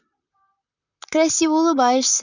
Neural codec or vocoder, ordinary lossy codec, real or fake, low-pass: vocoder, 44.1 kHz, 128 mel bands, Pupu-Vocoder; none; fake; 7.2 kHz